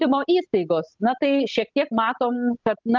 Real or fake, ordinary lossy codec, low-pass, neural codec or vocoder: real; Opus, 32 kbps; 7.2 kHz; none